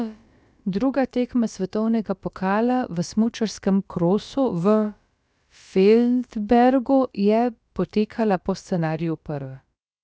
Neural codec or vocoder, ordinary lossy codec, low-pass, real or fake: codec, 16 kHz, about 1 kbps, DyCAST, with the encoder's durations; none; none; fake